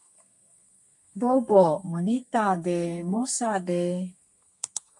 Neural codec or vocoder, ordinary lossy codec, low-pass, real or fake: codec, 32 kHz, 1.9 kbps, SNAC; MP3, 48 kbps; 10.8 kHz; fake